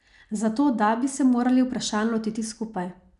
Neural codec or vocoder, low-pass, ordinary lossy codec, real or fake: none; 10.8 kHz; none; real